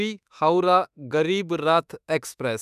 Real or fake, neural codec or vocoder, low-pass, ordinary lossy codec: fake; autoencoder, 48 kHz, 32 numbers a frame, DAC-VAE, trained on Japanese speech; 14.4 kHz; none